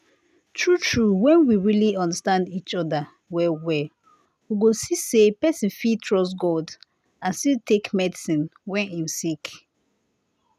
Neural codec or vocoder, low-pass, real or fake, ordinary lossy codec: none; 14.4 kHz; real; none